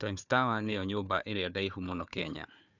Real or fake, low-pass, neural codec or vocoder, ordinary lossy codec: fake; 7.2 kHz; codec, 16 kHz, 4 kbps, FunCodec, trained on Chinese and English, 50 frames a second; none